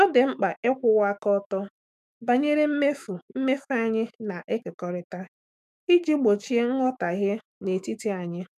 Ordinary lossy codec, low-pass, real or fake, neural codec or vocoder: none; 14.4 kHz; fake; autoencoder, 48 kHz, 128 numbers a frame, DAC-VAE, trained on Japanese speech